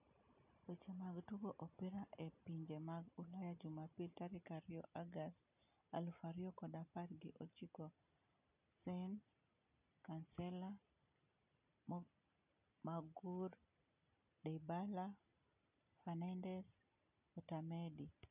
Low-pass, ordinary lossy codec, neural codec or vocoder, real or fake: 3.6 kHz; none; none; real